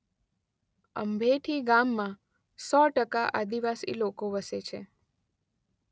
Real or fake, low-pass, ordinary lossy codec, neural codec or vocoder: real; none; none; none